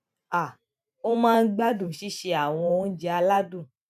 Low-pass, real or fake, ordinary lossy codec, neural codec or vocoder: 14.4 kHz; fake; none; vocoder, 44.1 kHz, 128 mel bands every 512 samples, BigVGAN v2